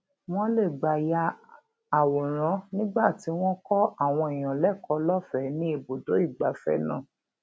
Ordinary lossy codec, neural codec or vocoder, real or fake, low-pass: none; none; real; none